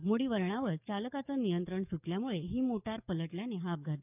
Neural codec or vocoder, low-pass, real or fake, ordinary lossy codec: codec, 44.1 kHz, 7.8 kbps, DAC; 3.6 kHz; fake; none